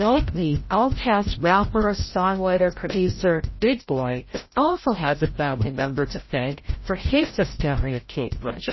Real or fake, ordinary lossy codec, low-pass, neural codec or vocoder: fake; MP3, 24 kbps; 7.2 kHz; codec, 16 kHz, 0.5 kbps, FreqCodec, larger model